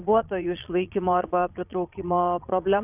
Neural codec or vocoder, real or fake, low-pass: vocoder, 22.05 kHz, 80 mel bands, Vocos; fake; 3.6 kHz